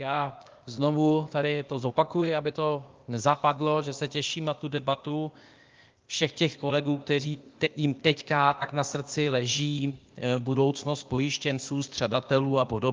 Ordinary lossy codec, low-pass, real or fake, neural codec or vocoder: Opus, 32 kbps; 7.2 kHz; fake; codec, 16 kHz, 0.8 kbps, ZipCodec